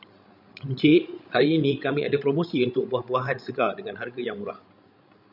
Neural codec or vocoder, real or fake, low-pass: codec, 16 kHz, 16 kbps, FreqCodec, larger model; fake; 5.4 kHz